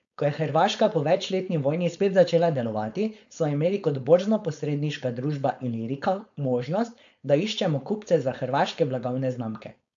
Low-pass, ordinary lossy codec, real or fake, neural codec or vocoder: 7.2 kHz; AAC, 64 kbps; fake; codec, 16 kHz, 4.8 kbps, FACodec